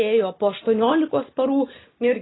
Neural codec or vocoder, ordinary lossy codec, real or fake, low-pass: none; AAC, 16 kbps; real; 7.2 kHz